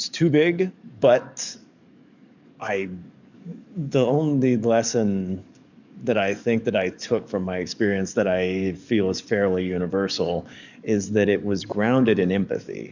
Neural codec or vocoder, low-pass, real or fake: codec, 44.1 kHz, 7.8 kbps, DAC; 7.2 kHz; fake